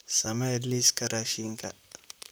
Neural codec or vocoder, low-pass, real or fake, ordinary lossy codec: vocoder, 44.1 kHz, 128 mel bands, Pupu-Vocoder; none; fake; none